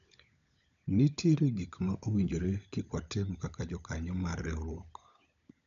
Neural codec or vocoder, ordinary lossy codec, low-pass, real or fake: codec, 16 kHz, 16 kbps, FunCodec, trained on LibriTTS, 50 frames a second; none; 7.2 kHz; fake